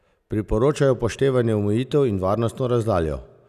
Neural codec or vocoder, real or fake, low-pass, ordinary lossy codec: none; real; 14.4 kHz; none